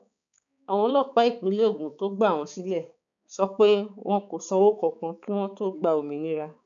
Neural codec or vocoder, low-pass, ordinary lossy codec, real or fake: codec, 16 kHz, 4 kbps, X-Codec, HuBERT features, trained on balanced general audio; 7.2 kHz; none; fake